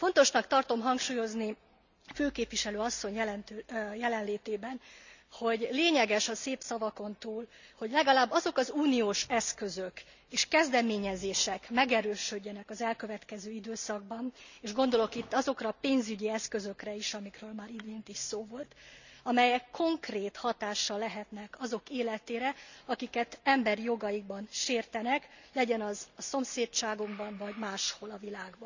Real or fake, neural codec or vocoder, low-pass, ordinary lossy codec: real; none; 7.2 kHz; none